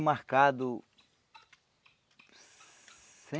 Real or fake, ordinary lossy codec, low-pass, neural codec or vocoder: real; none; none; none